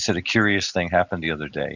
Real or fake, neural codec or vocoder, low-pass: real; none; 7.2 kHz